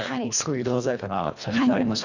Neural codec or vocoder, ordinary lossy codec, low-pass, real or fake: codec, 24 kHz, 1.5 kbps, HILCodec; none; 7.2 kHz; fake